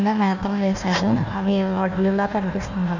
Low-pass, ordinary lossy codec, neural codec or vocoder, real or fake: 7.2 kHz; none; codec, 16 kHz, 1 kbps, FunCodec, trained on Chinese and English, 50 frames a second; fake